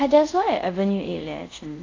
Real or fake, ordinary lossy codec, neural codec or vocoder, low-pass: fake; AAC, 32 kbps; codec, 24 kHz, 0.5 kbps, DualCodec; 7.2 kHz